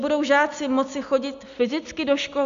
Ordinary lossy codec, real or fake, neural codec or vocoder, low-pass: MP3, 64 kbps; real; none; 7.2 kHz